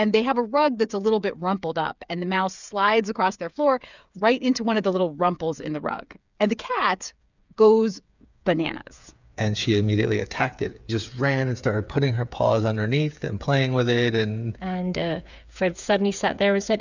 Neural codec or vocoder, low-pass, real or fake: codec, 16 kHz, 8 kbps, FreqCodec, smaller model; 7.2 kHz; fake